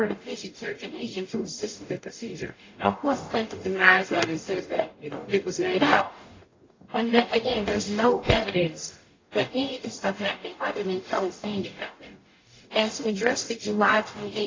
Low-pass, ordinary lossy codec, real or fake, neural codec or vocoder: 7.2 kHz; AAC, 32 kbps; fake; codec, 44.1 kHz, 0.9 kbps, DAC